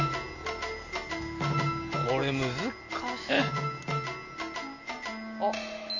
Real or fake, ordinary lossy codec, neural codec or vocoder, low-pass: real; none; none; 7.2 kHz